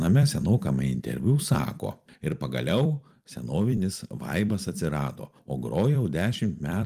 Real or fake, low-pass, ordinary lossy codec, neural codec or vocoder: fake; 14.4 kHz; Opus, 32 kbps; vocoder, 44.1 kHz, 128 mel bands every 256 samples, BigVGAN v2